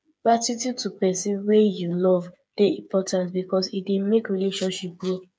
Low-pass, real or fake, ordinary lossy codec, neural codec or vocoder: none; fake; none; codec, 16 kHz, 8 kbps, FreqCodec, smaller model